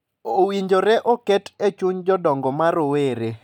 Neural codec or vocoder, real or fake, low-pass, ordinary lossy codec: none; real; 19.8 kHz; none